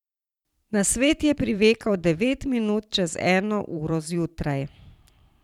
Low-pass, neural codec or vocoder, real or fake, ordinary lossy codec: 19.8 kHz; vocoder, 44.1 kHz, 128 mel bands every 512 samples, BigVGAN v2; fake; none